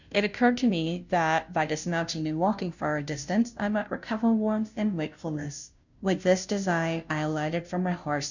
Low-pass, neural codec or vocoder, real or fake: 7.2 kHz; codec, 16 kHz, 0.5 kbps, FunCodec, trained on Chinese and English, 25 frames a second; fake